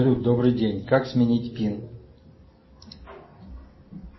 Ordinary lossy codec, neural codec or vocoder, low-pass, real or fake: MP3, 24 kbps; none; 7.2 kHz; real